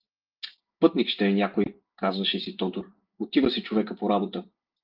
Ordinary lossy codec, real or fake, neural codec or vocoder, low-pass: Opus, 32 kbps; real; none; 5.4 kHz